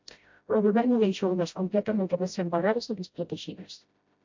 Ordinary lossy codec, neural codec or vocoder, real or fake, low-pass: MP3, 48 kbps; codec, 16 kHz, 0.5 kbps, FreqCodec, smaller model; fake; 7.2 kHz